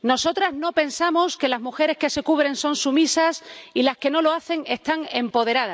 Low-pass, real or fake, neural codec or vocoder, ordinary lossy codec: none; real; none; none